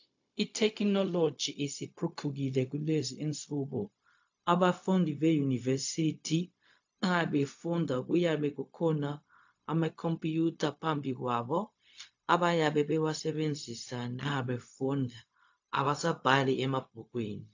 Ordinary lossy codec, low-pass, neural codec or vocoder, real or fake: AAC, 48 kbps; 7.2 kHz; codec, 16 kHz, 0.4 kbps, LongCat-Audio-Codec; fake